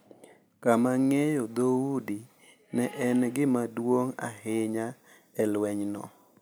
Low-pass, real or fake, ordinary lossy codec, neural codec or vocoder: none; real; none; none